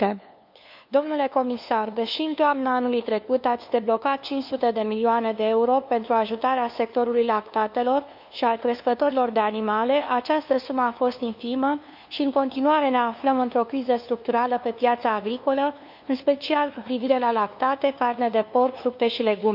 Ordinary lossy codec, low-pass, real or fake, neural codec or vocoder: none; 5.4 kHz; fake; codec, 16 kHz, 2 kbps, FunCodec, trained on LibriTTS, 25 frames a second